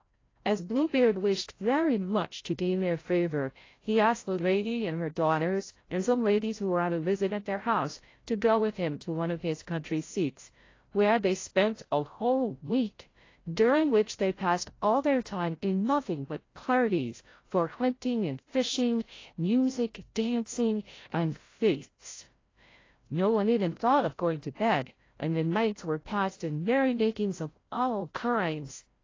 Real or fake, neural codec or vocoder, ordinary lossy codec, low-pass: fake; codec, 16 kHz, 0.5 kbps, FreqCodec, larger model; AAC, 32 kbps; 7.2 kHz